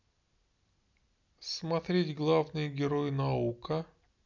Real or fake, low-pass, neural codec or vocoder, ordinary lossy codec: real; 7.2 kHz; none; none